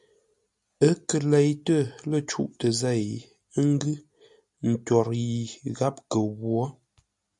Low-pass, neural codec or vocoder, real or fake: 10.8 kHz; none; real